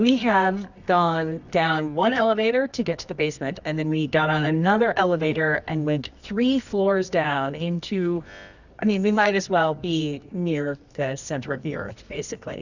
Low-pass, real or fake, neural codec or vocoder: 7.2 kHz; fake; codec, 24 kHz, 0.9 kbps, WavTokenizer, medium music audio release